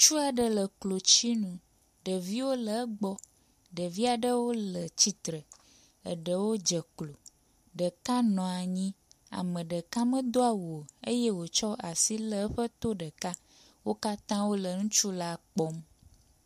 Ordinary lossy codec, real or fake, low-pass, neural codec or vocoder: MP3, 96 kbps; real; 14.4 kHz; none